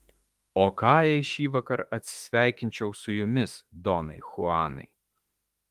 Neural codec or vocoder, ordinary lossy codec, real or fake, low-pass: autoencoder, 48 kHz, 32 numbers a frame, DAC-VAE, trained on Japanese speech; Opus, 24 kbps; fake; 14.4 kHz